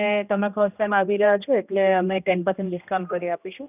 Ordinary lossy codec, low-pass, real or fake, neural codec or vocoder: none; 3.6 kHz; fake; codec, 16 kHz, 1 kbps, X-Codec, HuBERT features, trained on general audio